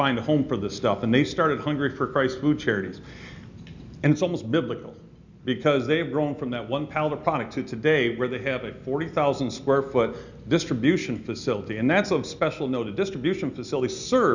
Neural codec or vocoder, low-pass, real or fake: none; 7.2 kHz; real